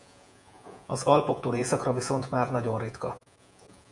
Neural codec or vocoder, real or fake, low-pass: vocoder, 48 kHz, 128 mel bands, Vocos; fake; 10.8 kHz